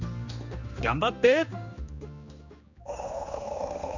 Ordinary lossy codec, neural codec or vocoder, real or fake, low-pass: none; codec, 16 kHz, 2 kbps, X-Codec, HuBERT features, trained on general audio; fake; 7.2 kHz